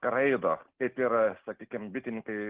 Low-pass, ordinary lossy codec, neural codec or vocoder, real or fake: 3.6 kHz; Opus, 16 kbps; none; real